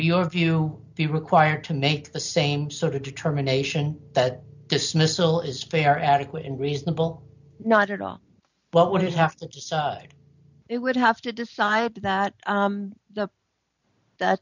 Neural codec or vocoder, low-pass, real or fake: none; 7.2 kHz; real